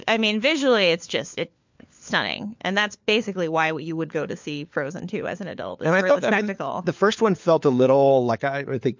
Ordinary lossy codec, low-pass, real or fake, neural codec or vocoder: MP3, 64 kbps; 7.2 kHz; fake; codec, 16 kHz, 4 kbps, FunCodec, trained on LibriTTS, 50 frames a second